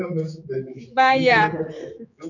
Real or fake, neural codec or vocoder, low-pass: fake; codec, 16 kHz, 4 kbps, X-Codec, HuBERT features, trained on general audio; 7.2 kHz